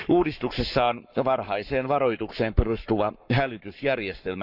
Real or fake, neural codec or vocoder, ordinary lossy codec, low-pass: fake; codec, 44.1 kHz, 7.8 kbps, Pupu-Codec; none; 5.4 kHz